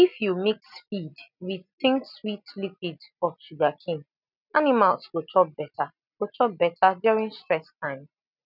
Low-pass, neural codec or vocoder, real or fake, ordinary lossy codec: 5.4 kHz; none; real; none